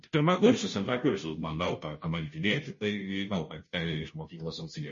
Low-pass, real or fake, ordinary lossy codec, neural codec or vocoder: 7.2 kHz; fake; MP3, 32 kbps; codec, 16 kHz, 0.5 kbps, FunCodec, trained on Chinese and English, 25 frames a second